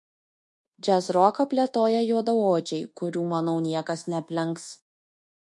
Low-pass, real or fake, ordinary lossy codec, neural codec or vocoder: 10.8 kHz; fake; MP3, 48 kbps; codec, 24 kHz, 1.2 kbps, DualCodec